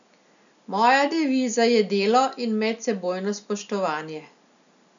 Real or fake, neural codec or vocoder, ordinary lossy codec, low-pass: real; none; none; 7.2 kHz